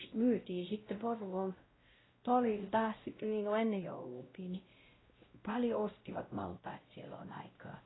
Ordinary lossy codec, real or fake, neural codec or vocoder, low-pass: AAC, 16 kbps; fake; codec, 16 kHz, 0.5 kbps, X-Codec, WavLM features, trained on Multilingual LibriSpeech; 7.2 kHz